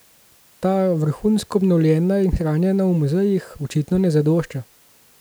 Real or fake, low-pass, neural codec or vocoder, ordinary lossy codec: real; none; none; none